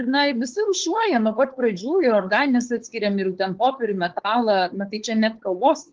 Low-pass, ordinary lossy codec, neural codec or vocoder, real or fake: 7.2 kHz; Opus, 16 kbps; codec, 16 kHz, 4.8 kbps, FACodec; fake